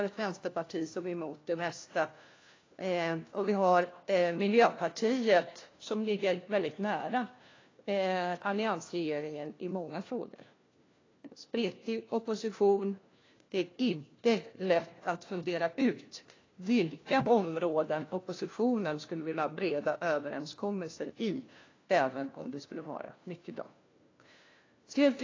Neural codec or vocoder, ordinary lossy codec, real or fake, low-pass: codec, 16 kHz, 1 kbps, FunCodec, trained on LibriTTS, 50 frames a second; AAC, 32 kbps; fake; 7.2 kHz